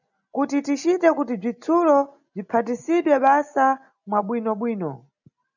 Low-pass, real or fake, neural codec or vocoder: 7.2 kHz; real; none